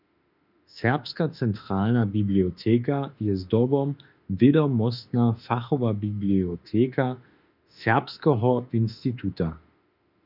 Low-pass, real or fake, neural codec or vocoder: 5.4 kHz; fake; autoencoder, 48 kHz, 32 numbers a frame, DAC-VAE, trained on Japanese speech